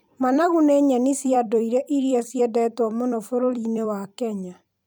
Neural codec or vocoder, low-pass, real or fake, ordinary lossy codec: vocoder, 44.1 kHz, 128 mel bands every 256 samples, BigVGAN v2; none; fake; none